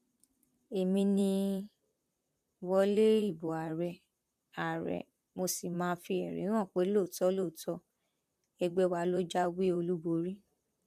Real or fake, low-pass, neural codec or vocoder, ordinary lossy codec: fake; 14.4 kHz; vocoder, 44.1 kHz, 128 mel bands, Pupu-Vocoder; none